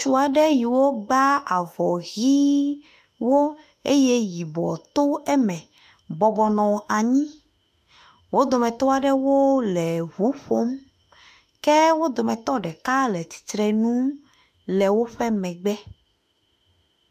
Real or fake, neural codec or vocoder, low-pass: fake; autoencoder, 48 kHz, 32 numbers a frame, DAC-VAE, trained on Japanese speech; 14.4 kHz